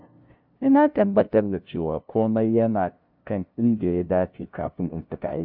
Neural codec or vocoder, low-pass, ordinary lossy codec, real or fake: codec, 16 kHz, 0.5 kbps, FunCodec, trained on LibriTTS, 25 frames a second; 5.4 kHz; none; fake